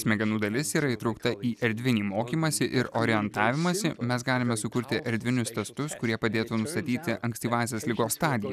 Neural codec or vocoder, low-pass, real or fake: none; 14.4 kHz; real